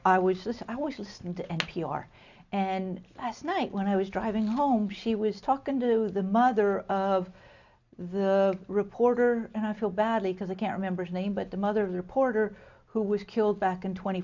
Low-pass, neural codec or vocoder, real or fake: 7.2 kHz; none; real